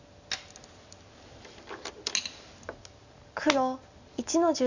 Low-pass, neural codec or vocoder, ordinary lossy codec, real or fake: 7.2 kHz; none; none; real